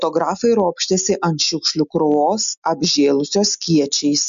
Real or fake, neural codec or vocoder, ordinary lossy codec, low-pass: real; none; MP3, 64 kbps; 7.2 kHz